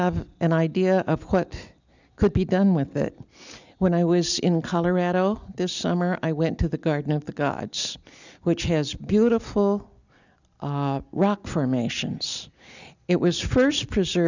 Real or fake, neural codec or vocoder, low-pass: real; none; 7.2 kHz